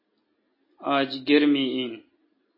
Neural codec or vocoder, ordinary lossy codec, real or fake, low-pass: none; MP3, 24 kbps; real; 5.4 kHz